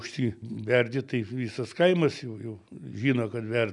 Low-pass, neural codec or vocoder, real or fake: 10.8 kHz; none; real